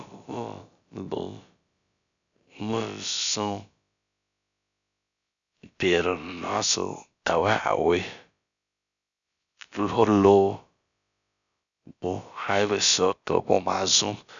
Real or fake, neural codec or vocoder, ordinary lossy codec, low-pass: fake; codec, 16 kHz, about 1 kbps, DyCAST, with the encoder's durations; MP3, 96 kbps; 7.2 kHz